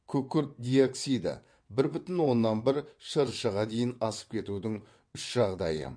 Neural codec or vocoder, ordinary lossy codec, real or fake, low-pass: vocoder, 22.05 kHz, 80 mel bands, WaveNeXt; MP3, 48 kbps; fake; 9.9 kHz